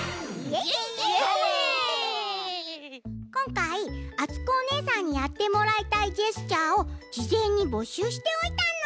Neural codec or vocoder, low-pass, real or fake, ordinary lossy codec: none; none; real; none